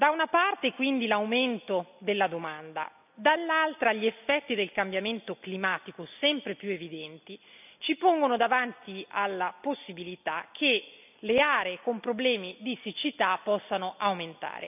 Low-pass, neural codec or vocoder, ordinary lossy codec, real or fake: 3.6 kHz; none; none; real